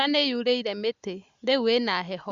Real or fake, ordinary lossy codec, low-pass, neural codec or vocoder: real; Opus, 64 kbps; 7.2 kHz; none